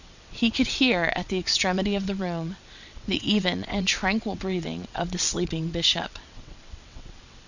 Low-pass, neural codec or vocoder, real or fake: 7.2 kHz; vocoder, 22.05 kHz, 80 mel bands, WaveNeXt; fake